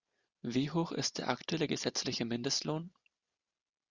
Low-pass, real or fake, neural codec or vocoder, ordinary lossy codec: 7.2 kHz; real; none; Opus, 64 kbps